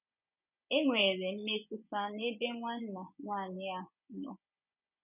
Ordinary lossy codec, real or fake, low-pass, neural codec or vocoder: none; fake; 3.6 kHz; vocoder, 24 kHz, 100 mel bands, Vocos